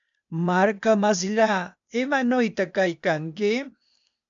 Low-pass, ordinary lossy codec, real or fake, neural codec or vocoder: 7.2 kHz; MP3, 64 kbps; fake; codec, 16 kHz, 0.8 kbps, ZipCodec